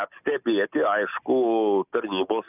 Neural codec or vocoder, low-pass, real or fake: none; 3.6 kHz; real